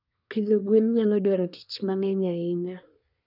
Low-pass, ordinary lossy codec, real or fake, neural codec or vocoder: 5.4 kHz; none; fake; codec, 24 kHz, 1 kbps, SNAC